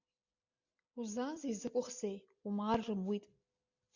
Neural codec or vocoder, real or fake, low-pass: none; real; 7.2 kHz